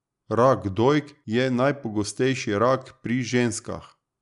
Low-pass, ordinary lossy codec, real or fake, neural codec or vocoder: 10.8 kHz; none; real; none